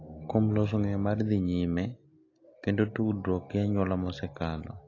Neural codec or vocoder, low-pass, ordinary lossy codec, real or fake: none; 7.2 kHz; AAC, 32 kbps; real